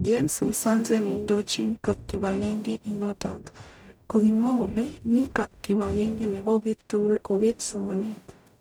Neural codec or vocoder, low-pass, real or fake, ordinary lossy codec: codec, 44.1 kHz, 0.9 kbps, DAC; none; fake; none